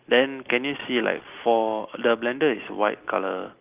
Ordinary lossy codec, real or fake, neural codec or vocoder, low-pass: Opus, 24 kbps; real; none; 3.6 kHz